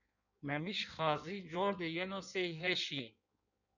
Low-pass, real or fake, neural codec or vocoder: 7.2 kHz; fake; codec, 16 kHz in and 24 kHz out, 1.1 kbps, FireRedTTS-2 codec